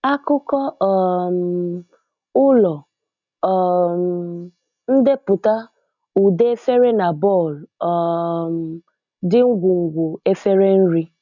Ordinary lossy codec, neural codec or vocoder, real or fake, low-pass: none; none; real; 7.2 kHz